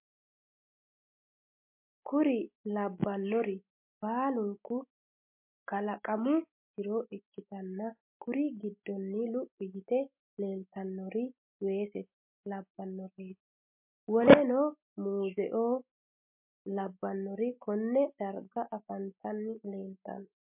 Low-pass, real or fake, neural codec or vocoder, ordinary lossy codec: 3.6 kHz; real; none; MP3, 32 kbps